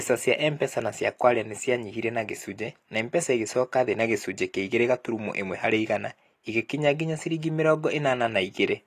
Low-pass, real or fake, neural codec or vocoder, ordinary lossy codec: 14.4 kHz; real; none; AAC, 48 kbps